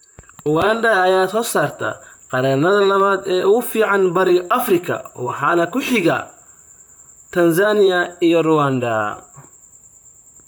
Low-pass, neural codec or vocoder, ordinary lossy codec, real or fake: none; vocoder, 44.1 kHz, 128 mel bands, Pupu-Vocoder; none; fake